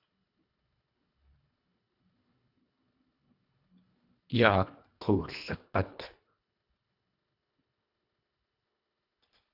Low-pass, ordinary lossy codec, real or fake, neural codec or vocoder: 5.4 kHz; AAC, 48 kbps; fake; codec, 24 kHz, 1.5 kbps, HILCodec